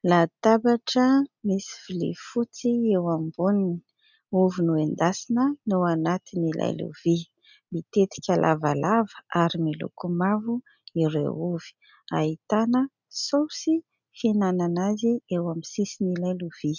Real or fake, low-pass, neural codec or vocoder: real; 7.2 kHz; none